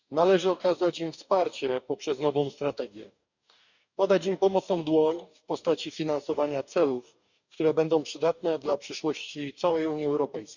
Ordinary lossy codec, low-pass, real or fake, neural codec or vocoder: none; 7.2 kHz; fake; codec, 44.1 kHz, 2.6 kbps, DAC